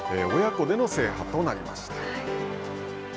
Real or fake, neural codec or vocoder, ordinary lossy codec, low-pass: real; none; none; none